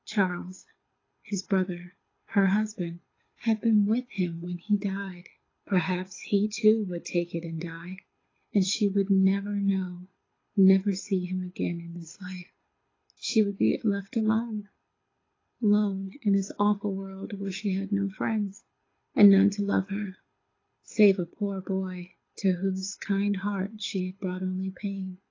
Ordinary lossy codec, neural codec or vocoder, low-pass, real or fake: AAC, 32 kbps; codec, 24 kHz, 6 kbps, HILCodec; 7.2 kHz; fake